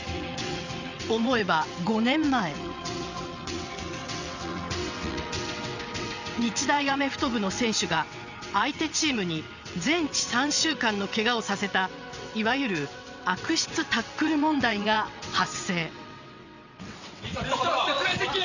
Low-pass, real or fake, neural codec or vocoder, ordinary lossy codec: 7.2 kHz; fake; vocoder, 22.05 kHz, 80 mel bands, WaveNeXt; none